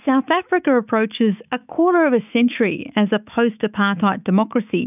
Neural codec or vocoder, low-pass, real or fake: autoencoder, 48 kHz, 128 numbers a frame, DAC-VAE, trained on Japanese speech; 3.6 kHz; fake